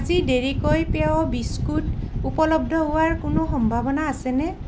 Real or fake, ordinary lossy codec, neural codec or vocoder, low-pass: real; none; none; none